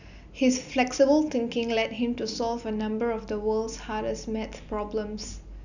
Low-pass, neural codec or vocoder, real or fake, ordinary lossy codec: 7.2 kHz; none; real; none